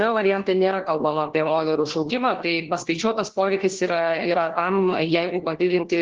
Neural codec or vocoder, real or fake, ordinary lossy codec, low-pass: codec, 16 kHz, 1 kbps, FunCodec, trained on LibriTTS, 50 frames a second; fake; Opus, 16 kbps; 7.2 kHz